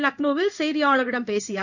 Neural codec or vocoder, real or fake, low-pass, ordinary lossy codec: codec, 16 kHz in and 24 kHz out, 1 kbps, XY-Tokenizer; fake; 7.2 kHz; none